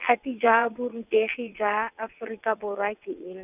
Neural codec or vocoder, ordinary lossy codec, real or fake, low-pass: vocoder, 22.05 kHz, 80 mel bands, WaveNeXt; none; fake; 3.6 kHz